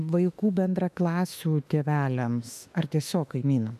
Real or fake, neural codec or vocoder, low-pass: fake; autoencoder, 48 kHz, 32 numbers a frame, DAC-VAE, trained on Japanese speech; 14.4 kHz